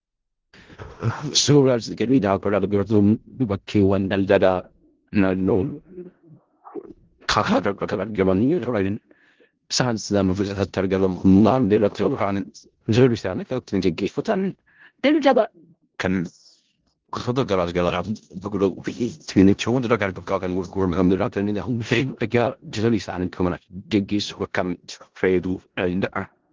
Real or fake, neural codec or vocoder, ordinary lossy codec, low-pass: fake; codec, 16 kHz in and 24 kHz out, 0.4 kbps, LongCat-Audio-Codec, four codebook decoder; Opus, 16 kbps; 7.2 kHz